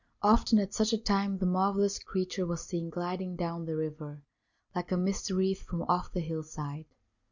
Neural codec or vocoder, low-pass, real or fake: none; 7.2 kHz; real